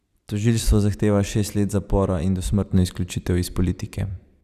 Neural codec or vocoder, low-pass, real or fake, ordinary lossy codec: vocoder, 44.1 kHz, 128 mel bands every 256 samples, BigVGAN v2; 14.4 kHz; fake; none